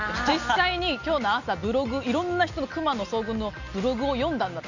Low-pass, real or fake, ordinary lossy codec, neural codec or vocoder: 7.2 kHz; real; none; none